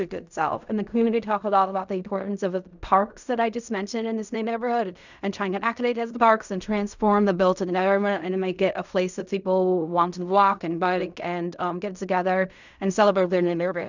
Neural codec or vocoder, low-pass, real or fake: codec, 16 kHz in and 24 kHz out, 0.4 kbps, LongCat-Audio-Codec, fine tuned four codebook decoder; 7.2 kHz; fake